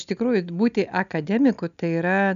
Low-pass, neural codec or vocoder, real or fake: 7.2 kHz; none; real